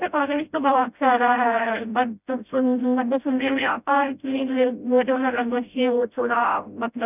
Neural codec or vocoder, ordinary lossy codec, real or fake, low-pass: codec, 16 kHz, 0.5 kbps, FreqCodec, smaller model; none; fake; 3.6 kHz